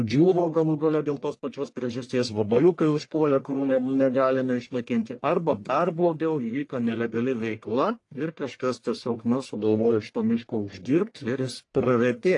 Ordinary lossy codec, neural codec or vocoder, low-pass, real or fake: AAC, 48 kbps; codec, 44.1 kHz, 1.7 kbps, Pupu-Codec; 10.8 kHz; fake